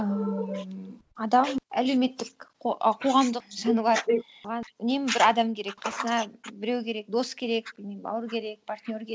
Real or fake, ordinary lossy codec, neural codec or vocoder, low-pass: real; none; none; none